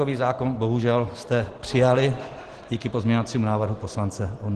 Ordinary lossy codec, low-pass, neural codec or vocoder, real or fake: Opus, 16 kbps; 10.8 kHz; none; real